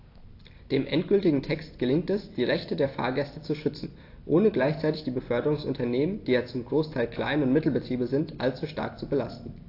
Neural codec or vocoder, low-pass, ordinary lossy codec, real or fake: none; 5.4 kHz; AAC, 32 kbps; real